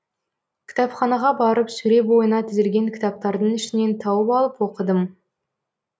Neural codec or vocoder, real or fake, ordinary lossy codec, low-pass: none; real; none; none